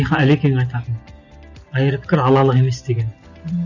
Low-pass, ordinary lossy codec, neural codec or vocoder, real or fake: 7.2 kHz; none; none; real